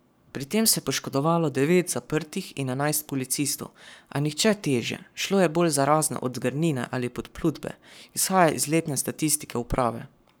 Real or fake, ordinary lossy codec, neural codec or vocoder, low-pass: fake; none; codec, 44.1 kHz, 7.8 kbps, Pupu-Codec; none